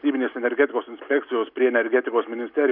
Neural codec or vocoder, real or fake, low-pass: none; real; 5.4 kHz